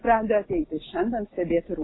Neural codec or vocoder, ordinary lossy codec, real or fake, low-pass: none; AAC, 16 kbps; real; 7.2 kHz